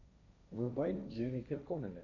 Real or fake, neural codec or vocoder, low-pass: fake; codec, 16 kHz, 1.1 kbps, Voila-Tokenizer; 7.2 kHz